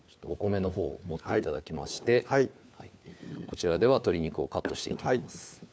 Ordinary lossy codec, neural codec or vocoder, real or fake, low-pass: none; codec, 16 kHz, 4 kbps, FunCodec, trained on LibriTTS, 50 frames a second; fake; none